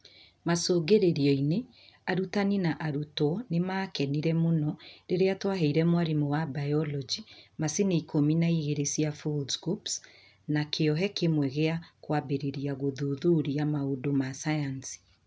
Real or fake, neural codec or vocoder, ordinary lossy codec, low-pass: real; none; none; none